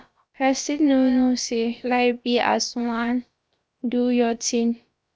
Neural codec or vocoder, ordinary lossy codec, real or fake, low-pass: codec, 16 kHz, about 1 kbps, DyCAST, with the encoder's durations; none; fake; none